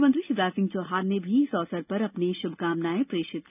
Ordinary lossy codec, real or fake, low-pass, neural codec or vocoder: none; real; 3.6 kHz; none